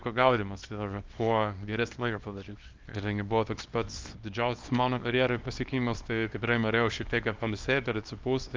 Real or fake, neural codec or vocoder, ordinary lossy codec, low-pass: fake; codec, 24 kHz, 0.9 kbps, WavTokenizer, small release; Opus, 24 kbps; 7.2 kHz